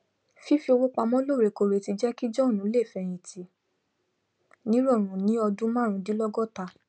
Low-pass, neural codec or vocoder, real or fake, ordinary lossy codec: none; none; real; none